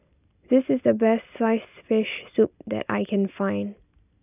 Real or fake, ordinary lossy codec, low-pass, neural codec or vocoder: real; none; 3.6 kHz; none